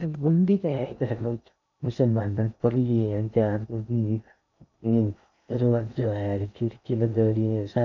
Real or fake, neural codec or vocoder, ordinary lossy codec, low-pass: fake; codec, 16 kHz in and 24 kHz out, 0.6 kbps, FocalCodec, streaming, 2048 codes; none; 7.2 kHz